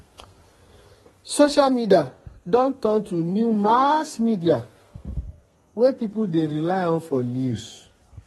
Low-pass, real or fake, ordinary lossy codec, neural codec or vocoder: 14.4 kHz; fake; AAC, 32 kbps; codec, 32 kHz, 1.9 kbps, SNAC